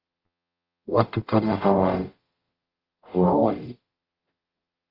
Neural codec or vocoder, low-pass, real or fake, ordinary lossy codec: codec, 44.1 kHz, 0.9 kbps, DAC; 5.4 kHz; fake; Opus, 24 kbps